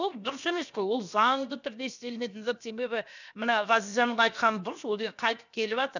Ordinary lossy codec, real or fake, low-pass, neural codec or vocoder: none; fake; 7.2 kHz; codec, 16 kHz, 0.7 kbps, FocalCodec